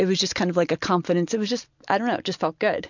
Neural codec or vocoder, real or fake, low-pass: none; real; 7.2 kHz